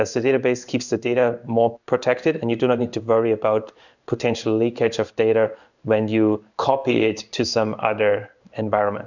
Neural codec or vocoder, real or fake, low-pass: codec, 16 kHz in and 24 kHz out, 1 kbps, XY-Tokenizer; fake; 7.2 kHz